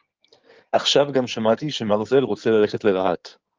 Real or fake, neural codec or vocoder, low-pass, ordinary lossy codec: fake; codec, 16 kHz in and 24 kHz out, 2.2 kbps, FireRedTTS-2 codec; 7.2 kHz; Opus, 32 kbps